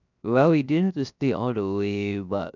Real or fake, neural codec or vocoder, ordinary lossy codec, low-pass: fake; codec, 16 kHz, 0.7 kbps, FocalCodec; none; 7.2 kHz